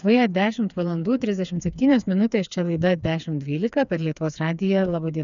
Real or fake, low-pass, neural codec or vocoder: fake; 7.2 kHz; codec, 16 kHz, 4 kbps, FreqCodec, smaller model